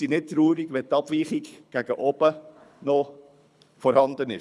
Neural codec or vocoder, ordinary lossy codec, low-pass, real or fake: codec, 24 kHz, 6 kbps, HILCodec; none; none; fake